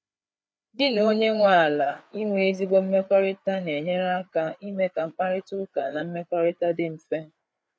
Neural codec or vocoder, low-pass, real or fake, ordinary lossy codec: codec, 16 kHz, 4 kbps, FreqCodec, larger model; none; fake; none